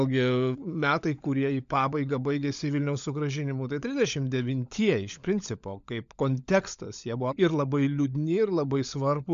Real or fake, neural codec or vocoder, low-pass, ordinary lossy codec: fake; codec, 16 kHz, 16 kbps, FunCodec, trained on LibriTTS, 50 frames a second; 7.2 kHz; MP3, 64 kbps